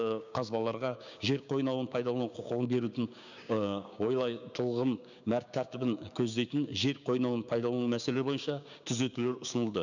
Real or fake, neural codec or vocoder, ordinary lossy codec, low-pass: fake; codec, 16 kHz, 6 kbps, DAC; none; 7.2 kHz